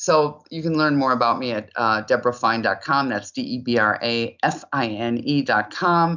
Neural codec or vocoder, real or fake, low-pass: none; real; 7.2 kHz